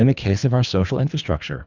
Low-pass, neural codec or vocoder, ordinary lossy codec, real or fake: 7.2 kHz; codec, 24 kHz, 3 kbps, HILCodec; Opus, 64 kbps; fake